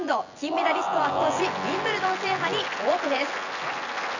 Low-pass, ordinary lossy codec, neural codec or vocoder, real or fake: 7.2 kHz; none; vocoder, 24 kHz, 100 mel bands, Vocos; fake